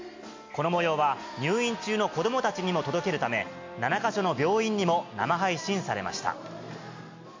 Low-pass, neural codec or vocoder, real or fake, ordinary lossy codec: 7.2 kHz; none; real; MP3, 48 kbps